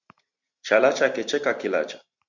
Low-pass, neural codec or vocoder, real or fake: 7.2 kHz; vocoder, 44.1 kHz, 128 mel bands every 512 samples, BigVGAN v2; fake